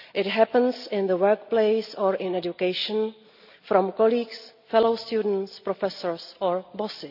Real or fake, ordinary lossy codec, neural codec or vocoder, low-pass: real; none; none; 5.4 kHz